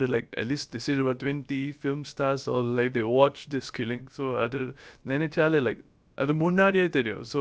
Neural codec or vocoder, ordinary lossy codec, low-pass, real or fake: codec, 16 kHz, about 1 kbps, DyCAST, with the encoder's durations; none; none; fake